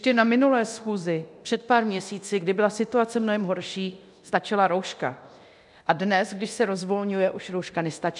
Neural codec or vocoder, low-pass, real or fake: codec, 24 kHz, 0.9 kbps, DualCodec; 10.8 kHz; fake